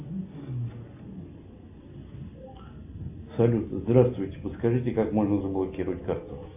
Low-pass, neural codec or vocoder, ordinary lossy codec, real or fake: 3.6 kHz; none; AAC, 32 kbps; real